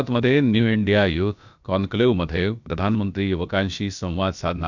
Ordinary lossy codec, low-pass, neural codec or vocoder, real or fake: none; 7.2 kHz; codec, 16 kHz, about 1 kbps, DyCAST, with the encoder's durations; fake